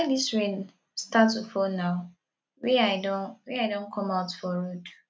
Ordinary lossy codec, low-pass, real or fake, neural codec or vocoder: none; none; real; none